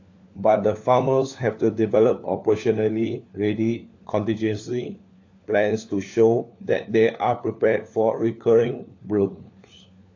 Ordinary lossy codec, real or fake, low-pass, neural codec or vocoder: AAC, 48 kbps; fake; 7.2 kHz; codec, 16 kHz, 4 kbps, FunCodec, trained on LibriTTS, 50 frames a second